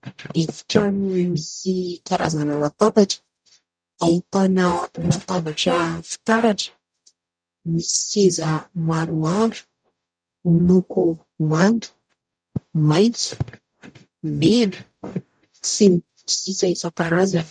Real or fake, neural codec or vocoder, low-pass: fake; codec, 44.1 kHz, 0.9 kbps, DAC; 9.9 kHz